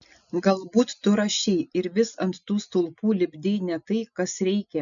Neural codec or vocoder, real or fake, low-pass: none; real; 7.2 kHz